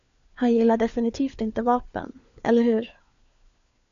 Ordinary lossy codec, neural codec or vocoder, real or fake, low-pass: AAC, 96 kbps; codec, 16 kHz, 4 kbps, FunCodec, trained on LibriTTS, 50 frames a second; fake; 7.2 kHz